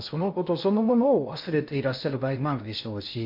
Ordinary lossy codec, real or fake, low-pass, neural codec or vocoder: MP3, 48 kbps; fake; 5.4 kHz; codec, 16 kHz in and 24 kHz out, 0.6 kbps, FocalCodec, streaming, 2048 codes